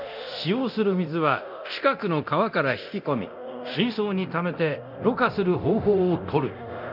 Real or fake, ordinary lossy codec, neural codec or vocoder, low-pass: fake; none; codec, 24 kHz, 0.9 kbps, DualCodec; 5.4 kHz